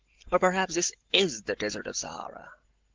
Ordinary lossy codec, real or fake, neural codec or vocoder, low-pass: Opus, 16 kbps; real; none; 7.2 kHz